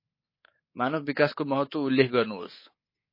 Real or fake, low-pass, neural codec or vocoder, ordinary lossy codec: fake; 5.4 kHz; codec, 24 kHz, 3.1 kbps, DualCodec; MP3, 24 kbps